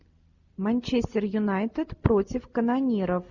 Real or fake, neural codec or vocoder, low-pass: real; none; 7.2 kHz